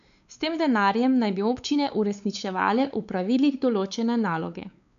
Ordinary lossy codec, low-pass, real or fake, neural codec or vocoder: none; 7.2 kHz; fake; codec, 16 kHz, 4 kbps, X-Codec, WavLM features, trained on Multilingual LibriSpeech